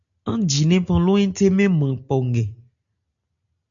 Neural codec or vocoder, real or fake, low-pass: none; real; 7.2 kHz